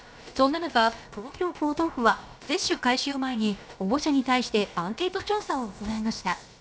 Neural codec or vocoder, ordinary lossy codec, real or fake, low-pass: codec, 16 kHz, about 1 kbps, DyCAST, with the encoder's durations; none; fake; none